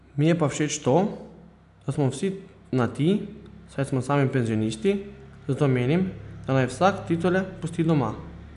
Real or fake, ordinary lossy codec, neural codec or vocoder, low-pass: real; none; none; 10.8 kHz